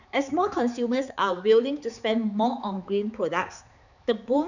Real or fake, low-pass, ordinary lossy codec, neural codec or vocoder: fake; 7.2 kHz; none; codec, 16 kHz, 4 kbps, X-Codec, HuBERT features, trained on balanced general audio